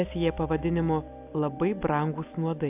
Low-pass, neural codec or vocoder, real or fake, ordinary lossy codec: 3.6 kHz; none; real; AAC, 32 kbps